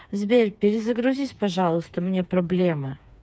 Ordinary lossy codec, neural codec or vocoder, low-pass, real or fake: none; codec, 16 kHz, 4 kbps, FreqCodec, smaller model; none; fake